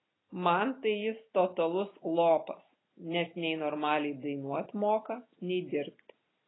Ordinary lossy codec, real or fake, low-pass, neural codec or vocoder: AAC, 16 kbps; real; 7.2 kHz; none